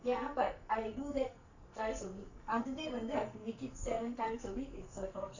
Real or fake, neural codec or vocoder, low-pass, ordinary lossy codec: fake; codec, 44.1 kHz, 2.6 kbps, SNAC; 7.2 kHz; none